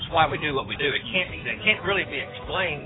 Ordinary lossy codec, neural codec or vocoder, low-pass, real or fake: AAC, 16 kbps; codec, 16 kHz in and 24 kHz out, 2.2 kbps, FireRedTTS-2 codec; 7.2 kHz; fake